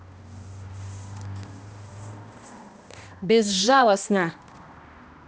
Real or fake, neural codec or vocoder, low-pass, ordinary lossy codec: fake; codec, 16 kHz, 1 kbps, X-Codec, HuBERT features, trained on balanced general audio; none; none